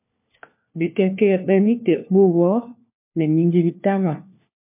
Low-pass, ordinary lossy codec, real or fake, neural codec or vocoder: 3.6 kHz; MP3, 32 kbps; fake; codec, 16 kHz, 1 kbps, FunCodec, trained on LibriTTS, 50 frames a second